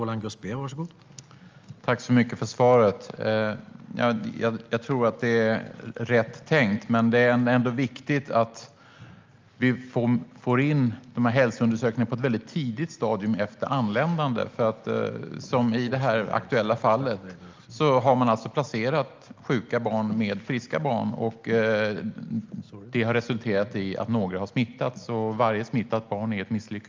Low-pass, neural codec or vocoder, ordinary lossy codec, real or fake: 7.2 kHz; none; Opus, 24 kbps; real